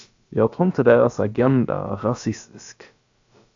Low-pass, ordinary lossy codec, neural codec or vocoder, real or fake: 7.2 kHz; AAC, 64 kbps; codec, 16 kHz, about 1 kbps, DyCAST, with the encoder's durations; fake